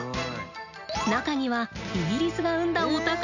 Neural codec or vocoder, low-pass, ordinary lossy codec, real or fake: none; 7.2 kHz; none; real